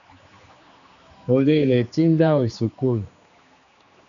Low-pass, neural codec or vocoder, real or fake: 7.2 kHz; codec, 16 kHz, 2 kbps, X-Codec, HuBERT features, trained on general audio; fake